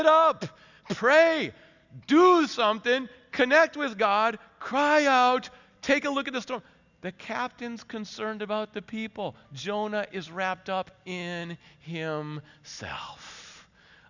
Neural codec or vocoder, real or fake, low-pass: none; real; 7.2 kHz